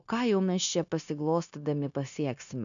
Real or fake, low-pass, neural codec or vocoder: fake; 7.2 kHz; codec, 16 kHz, 0.9 kbps, LongCat-Audio-Codec